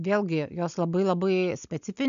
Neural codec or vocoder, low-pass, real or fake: none; 7.2 kHz; real